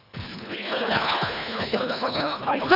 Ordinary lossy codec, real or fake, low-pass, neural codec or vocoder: none; fake; 5.4 kHz; codec, 24 kHz, 1.5 kbps, HILCodec